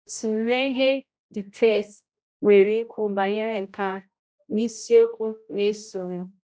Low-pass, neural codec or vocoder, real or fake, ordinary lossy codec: none; codec, 16 kHz, 0.5 kbps, X-Codec, HuBERT features, trained on general audio; fake; none